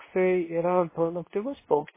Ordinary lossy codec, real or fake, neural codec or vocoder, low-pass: MP3, 16 kbps; fake; codec, 24 kHz, 0.9 kbps, WavTokenizer, medium speech release version 2; 3.6 kHz